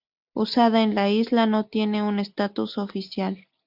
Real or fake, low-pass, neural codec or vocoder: real; 5.4 kHz; none